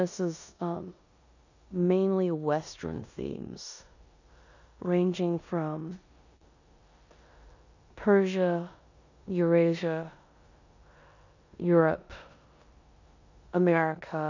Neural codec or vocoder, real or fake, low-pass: codec, 16 kHz in and 24 kHz out, 0.9 kbps, LongCat-Audio-Codec, four codebook decoder; fake; 7.2 kHz